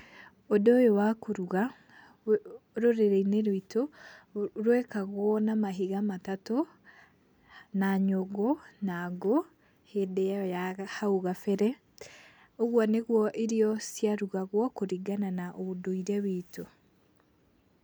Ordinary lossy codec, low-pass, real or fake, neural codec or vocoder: none; none; real; none